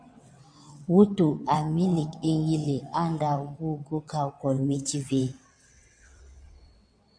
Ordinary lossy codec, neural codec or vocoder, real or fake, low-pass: MP3, 64 kbps; vocoder, 22.05 kHz, 80 mel bands, WaveNeXt; fake; 9.9 kHz